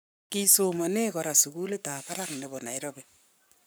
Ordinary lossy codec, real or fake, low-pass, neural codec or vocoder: none; fake; none; codec, 44.1 kHz, 7.8 kbps, Pupu-Codec